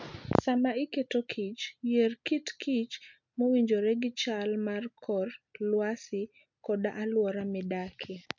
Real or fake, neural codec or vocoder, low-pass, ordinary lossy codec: real; none; 7.2 kHz; MP3, 64 kbps